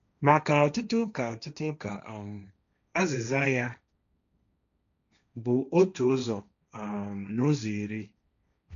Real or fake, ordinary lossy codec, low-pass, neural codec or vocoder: fake; none; 7.2 kHz; codec, 16 kHz, 1.1 kbps, Voila-Tokenizer